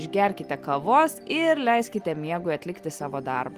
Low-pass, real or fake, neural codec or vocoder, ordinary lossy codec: 14.4 kHz; real; none; Opus, 24 kbps